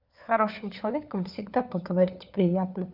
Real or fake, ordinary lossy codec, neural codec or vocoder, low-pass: fake; none; codec, 16 kHz, 4 kbps, FunCodec, trained on LibriTTS, 50 frames a second; 5.4 kHz